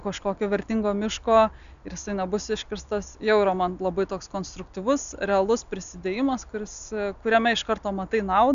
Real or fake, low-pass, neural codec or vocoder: real; 7.2 kHz; none